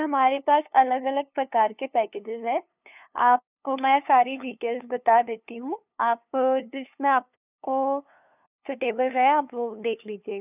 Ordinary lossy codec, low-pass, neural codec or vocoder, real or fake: none; 3.6 kHz; codec, 16 kHz, 2 kbps, FunCodec, trained on LibriTTS, 25 frames a second; fake